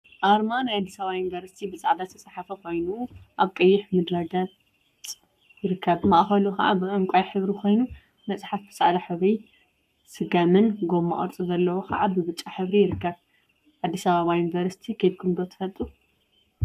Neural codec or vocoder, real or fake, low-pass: codec, 44.1 kHz, 7.8 kbps, Pupu-Codec; fake; 14.4 kHz